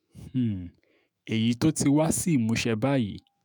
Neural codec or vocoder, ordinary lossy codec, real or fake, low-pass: autoencoder, 48 kHz, 128 numbers a frame, DAC-VAE, trained on Japanese speech; none; fake; none